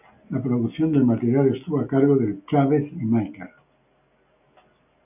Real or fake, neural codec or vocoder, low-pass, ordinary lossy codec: real; none; 3.6 kHz; AAC, 32 kbps